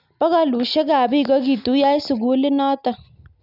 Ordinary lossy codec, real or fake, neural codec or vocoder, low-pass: none; real; none; 5.4 kHz